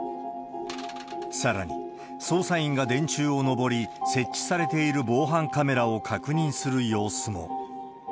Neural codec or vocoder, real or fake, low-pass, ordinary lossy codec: none; real; none; none